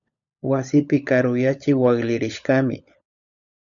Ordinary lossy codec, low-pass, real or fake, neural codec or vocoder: MP3, 96 kbps; 7.2 kHz; fake; codec, 16 kHz, 16 kbps, FunCodec, trained on LibriTTS, 50 frames a second